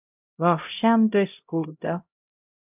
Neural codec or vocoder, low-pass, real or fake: codec, 16 kHz, 0.5 kbps, X-Codec, HuBERT features, trained on LibriSpeech; 3.6 kHz; fake